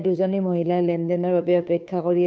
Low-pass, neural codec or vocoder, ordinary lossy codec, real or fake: none; codec, 16 kHz, 2 kbps, FunCodec, trained on Chinese and English, 25 frames a second; none; fake